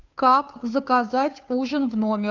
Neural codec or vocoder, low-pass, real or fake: codec, 16 kHz, 2 kbps, FunCodec, trained on Chinese and English, 25 frames a second; 7.2 kHz; fake